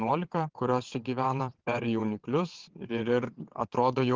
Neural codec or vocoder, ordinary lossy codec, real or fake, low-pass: vocoder, 22.05 kHz, 80 mel bands, WaveNeXt; Opus, 16 kbps; fake; 7.2 kHz